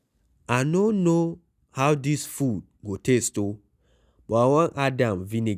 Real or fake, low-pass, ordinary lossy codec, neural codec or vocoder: real; 14.4 kHz; none; none